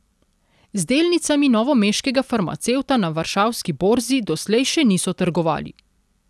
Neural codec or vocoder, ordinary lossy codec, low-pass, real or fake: none; none; none; real